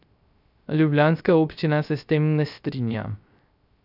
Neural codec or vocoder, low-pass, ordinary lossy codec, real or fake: codec, 16 kHz, 0.3 kbps, FocalCodec; 5.4 kHz; none; fake